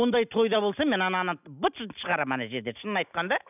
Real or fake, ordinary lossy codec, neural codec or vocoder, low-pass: real; none; none; 3.6 kHz